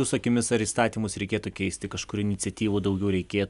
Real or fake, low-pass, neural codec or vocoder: real; 10.8 kHz; none